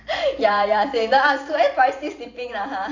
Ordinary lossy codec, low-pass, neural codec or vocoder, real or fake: AAC, 48 kbps; 7.2 kHz; vocoder, 44.1 kHz, 128 mel bands every 512 samples, BigVGAN v2; fake